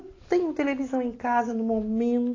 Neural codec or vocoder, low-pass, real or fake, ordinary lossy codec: none; 7.2 kHz; real; AAC, 32 kbps